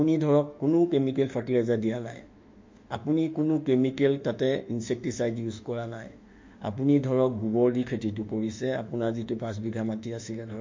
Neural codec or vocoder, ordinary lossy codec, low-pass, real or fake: autoencoder, 48 kHz, 32 numbers a frame, DAC-VAE, trained on Japanese speech; MP3, 48 kbps; 7.2 kHz; fake